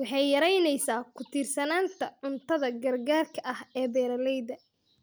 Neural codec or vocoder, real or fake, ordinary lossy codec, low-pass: none; real; none; none